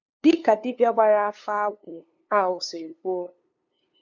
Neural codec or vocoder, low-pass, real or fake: codec, 16 kHz, 2 kbps, FunCodec, trained on LibriTTS, 25 frames a second; 7.2 kHz; fake